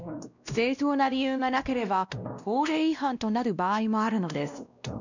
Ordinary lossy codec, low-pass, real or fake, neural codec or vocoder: none; 7.2 kHz; fake; codec, 16 kHz, 1 kbps, X-Codec, WavLM features, trained on Multilingual LibriSpeech